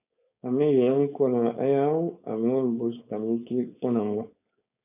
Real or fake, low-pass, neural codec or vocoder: fake; 3.6 kHz; codec, 16 kHz, 4.8 kbps, FACodec